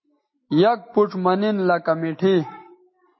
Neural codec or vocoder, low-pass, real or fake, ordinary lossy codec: none; 7.2 kHz; real; MP3, 24 kbps